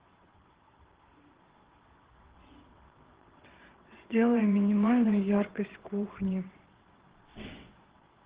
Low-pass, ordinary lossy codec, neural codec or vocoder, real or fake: 3.6 kHz; Opus, 16 kbps; vocoder, 22.05 kHz, 80 mel bands, WaveNeXt; fake